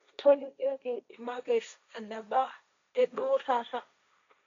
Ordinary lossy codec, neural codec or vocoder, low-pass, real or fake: MP3, 64 kbps; codec, 16 kHz, 1.1 kbps, Voila-Tokenizer; 7.2 kHz; fake